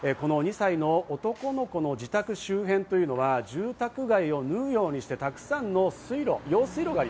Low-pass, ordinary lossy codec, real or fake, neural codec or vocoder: none; none; real; none